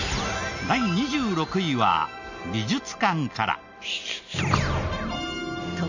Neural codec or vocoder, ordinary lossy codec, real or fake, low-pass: none; none; real; 7.2 kHz